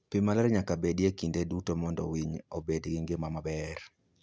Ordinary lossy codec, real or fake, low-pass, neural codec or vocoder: none; real; none; none